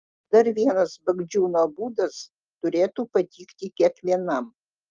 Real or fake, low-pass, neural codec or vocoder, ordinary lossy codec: real; 7.2 kHz; none; Opus, 32 kbps